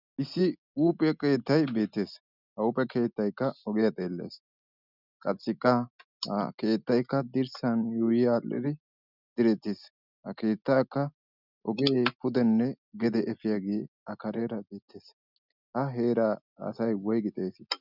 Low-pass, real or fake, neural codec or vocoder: 5.4 kHz; real; none